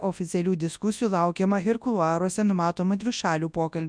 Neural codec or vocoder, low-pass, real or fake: codec, 24 kHz, 0.9 kbps, WavTokenizer, large speech release; 9.9 kHz; fake